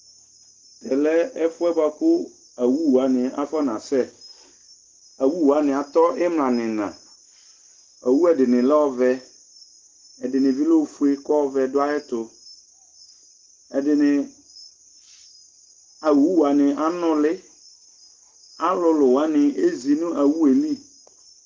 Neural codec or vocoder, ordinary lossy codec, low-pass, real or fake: none; Opus, 16 kbps; 7.2 kHz; real